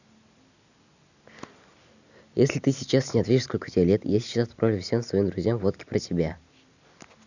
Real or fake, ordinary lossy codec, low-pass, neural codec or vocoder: real; none; 7.2 kHz; none